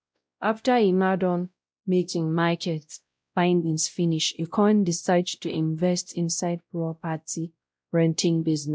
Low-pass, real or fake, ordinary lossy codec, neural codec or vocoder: none; fake; none; codec, 16 kHz, 0.5 kbps, X-Codec, WavLM features, trained on Multilingual LibriSpeech